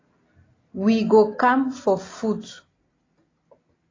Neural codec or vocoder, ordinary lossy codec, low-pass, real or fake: none; AAC, 32 kbps; 7.2 kHz; real